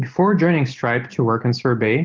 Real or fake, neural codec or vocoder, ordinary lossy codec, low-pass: real; none; Opus, 32 kbps; 7.2 kHz